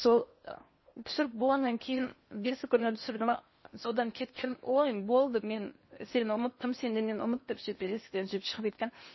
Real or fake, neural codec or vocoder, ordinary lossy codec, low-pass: fake; codec, 16 kHz in and 24 kHz out, 0.8 kbps, FocalCodec, streaming, 65536 codes; MP3, 24 kbps; 7.2 kHz